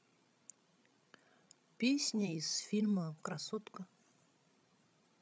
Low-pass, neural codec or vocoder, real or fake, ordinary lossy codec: none; codec, 16 kHz, 16 kbps, FreqCodec, larger model; fake; none